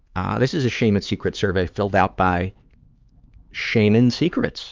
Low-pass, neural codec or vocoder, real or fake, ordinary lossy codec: 7.2 kHz; codec, 16 kHz, 4 kbps, X-Codec, HuBERT features, trained on LibriSpeech; fake; Opus, 24 kbps